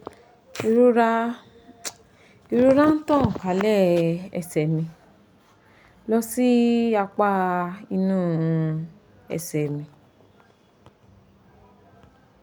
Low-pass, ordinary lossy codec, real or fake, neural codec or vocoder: none; none; real; none